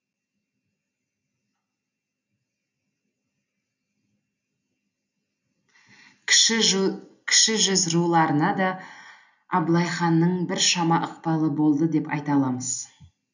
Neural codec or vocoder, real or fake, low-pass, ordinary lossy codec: none; real; 7.2 kHz; none